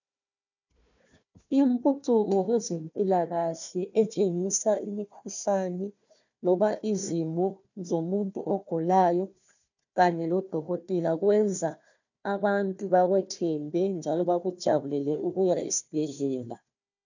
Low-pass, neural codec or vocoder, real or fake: 7.2 kHz; codec, 16 kHz, 1 kbps, FunCodec, trained on Chinese and English, 50 frames a second; fake